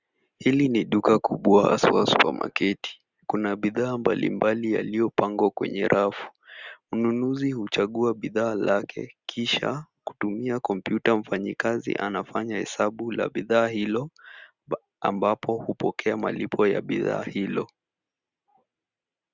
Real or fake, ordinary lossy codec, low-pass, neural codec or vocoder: real; Opus, 64 kbps; 7.2 kHz; none